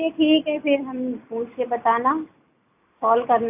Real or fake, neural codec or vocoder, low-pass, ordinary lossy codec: real; none; 3.6 kHz; AAC, 32 kbps